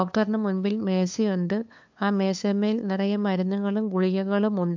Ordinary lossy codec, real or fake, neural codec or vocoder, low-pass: MP3, 64 kbps; fake; codec, 16 kHz, 2 kbps, FunCodec, trained on LibriTTS, 25 frames a second; 7.2 kHz